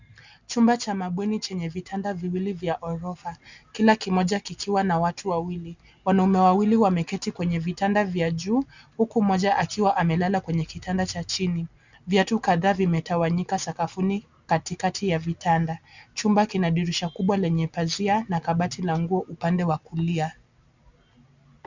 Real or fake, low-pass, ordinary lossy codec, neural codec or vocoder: real; 7.2 kHz; Opus, 64 kbps; none